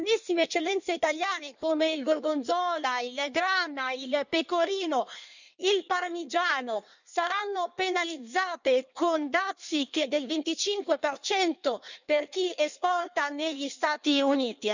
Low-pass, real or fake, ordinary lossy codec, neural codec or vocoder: 7.2 kHz; fake; none; codec, 16 kHz in and 24 kHz out, 1.1 kbps, FireRedTTS-2 codec